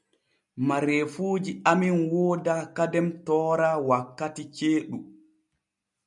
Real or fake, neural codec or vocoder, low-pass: real; none; 10.8 kHz